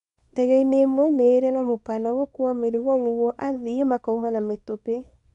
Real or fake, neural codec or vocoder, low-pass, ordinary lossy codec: fake; codec, 24 kHz, 0.9 kbps, WavTokenizer, small release; 10.8 kHz; none